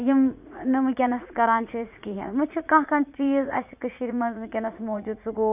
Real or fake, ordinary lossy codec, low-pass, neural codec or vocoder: fake; none; 3.6 kHz; vocoder, 44.1 kHz, 80 mel bands, Vocos